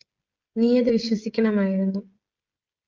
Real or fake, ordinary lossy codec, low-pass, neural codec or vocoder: fake; Opus, 24 kbps; 7.2 kHz; codec, 16 kHz, 16 kbps, FreqCodec, smaller model